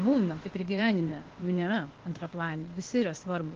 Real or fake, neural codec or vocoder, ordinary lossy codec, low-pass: fake; codec, 16 kHz, 0.8 kbps, ZipCodec; Opus, 16 kbps; 7.2 kHz